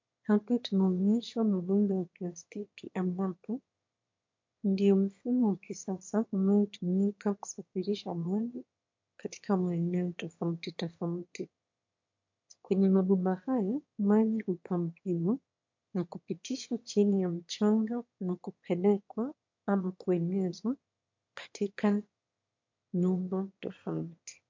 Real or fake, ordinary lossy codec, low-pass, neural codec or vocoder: fake; MP3, 48 kbps; 7.2 kHz; autoencoder, 22.05 kHz, a latent of 192 numbers a frame, VITS, trained on one speaker